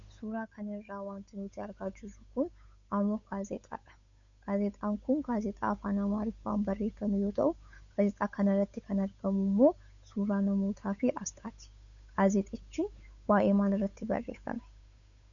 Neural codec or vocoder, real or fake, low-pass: codec, 16 kHz, 8 kbps, FunCodec, trained on Chinese and English, 25 frames a second; fake; 7.2 kHz